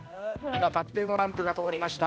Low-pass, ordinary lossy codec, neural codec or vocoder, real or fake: none; none; codec, 16 kHz, 1 kbps, X-Codec, HuBERT features, trained on balanced general audio; fake